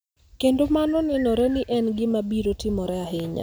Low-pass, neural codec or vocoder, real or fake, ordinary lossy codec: none; none; real; none